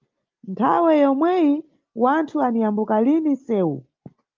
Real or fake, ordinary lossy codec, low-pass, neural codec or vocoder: real; Opus, 32 kbps; 7.2 kHz; none